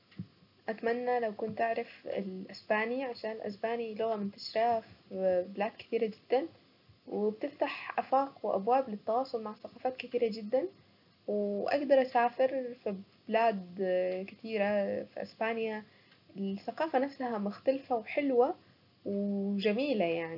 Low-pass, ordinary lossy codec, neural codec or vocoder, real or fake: 5.4 kHz; none; none; real